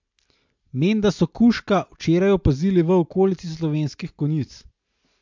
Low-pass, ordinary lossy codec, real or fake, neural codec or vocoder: 7.2 kHz; MP3, 64 kbps; real; none